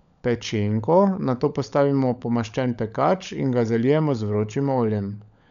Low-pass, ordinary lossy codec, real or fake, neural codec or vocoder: 7.2 kHz; none; fake; codec, 16 kHz, 16 kbps, FunCodec, trained on LibriTTS, 50 frames a second